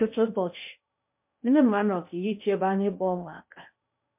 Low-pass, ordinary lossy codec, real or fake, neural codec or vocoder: 3.6 kHz; MP3, 32 kbps; fake; codec, 16 kHz in and 24 kHz out, 0.6 kbps, FocalCodec, streaming, 2048 codes